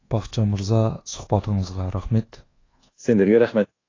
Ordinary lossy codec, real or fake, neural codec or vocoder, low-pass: AAC, 32 kbps; fake; codec, 24 kHz, 1.2 kbps, DualCodec; 7.2 kHz